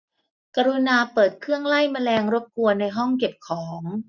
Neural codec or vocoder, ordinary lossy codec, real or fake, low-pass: none; none; real; 7.2 kHz